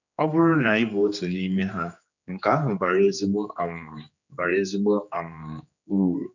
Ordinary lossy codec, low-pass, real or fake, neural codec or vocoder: none; 7.2 kHz; fake; codec, 16 kHz, 2 kbps, X-Codec, HuBERT features, trained on general audio